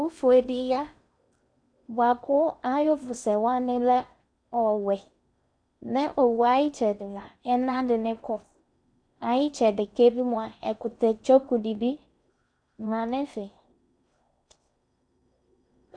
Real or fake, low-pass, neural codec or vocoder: fake; 9.9 kHz; codec, 16 kHz in and 24 kHz out, 0.8 kbps, FocalCodec, streaming, 65536 codes